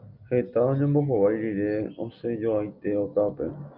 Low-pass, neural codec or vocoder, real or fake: 5.4 kHz; none; real